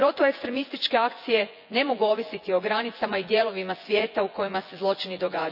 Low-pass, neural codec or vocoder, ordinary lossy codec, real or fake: 5.4 kHz; vocoder, 24 kHz, 100 mel bands, Vocos; none; fake